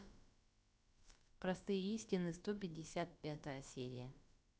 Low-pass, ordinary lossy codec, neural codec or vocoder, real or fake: none; none; codec, 16 kHz, about 1 kbps, DyCAST, with the encoder's durations; fake